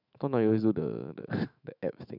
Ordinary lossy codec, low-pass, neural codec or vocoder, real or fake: none; 5.4 kHz; autoencoder, 48 kHz, 128 numbers a frame, DAC-VAE, trained on Japanese speech; fake